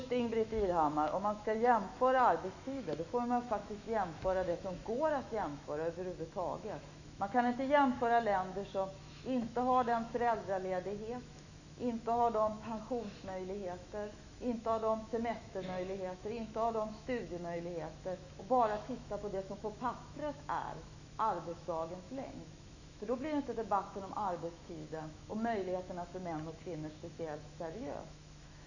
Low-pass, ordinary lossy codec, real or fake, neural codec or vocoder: 7.2 kHz; none; fake; autoencoder, 48 kHz, 128 numbers a frame, DAC-VAE, trained on Japanese speech